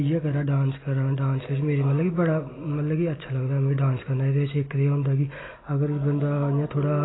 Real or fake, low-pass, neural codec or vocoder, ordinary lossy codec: real; 7.2 kHz; none; AAC, 16 kbps